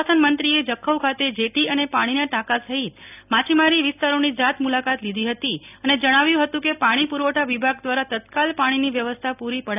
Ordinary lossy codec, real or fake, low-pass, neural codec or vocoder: none; real; 3.6 kHz; none